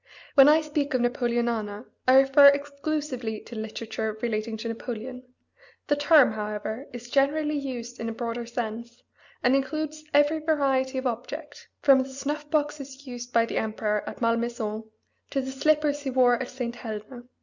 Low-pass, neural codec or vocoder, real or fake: 7.2 kHz; none; real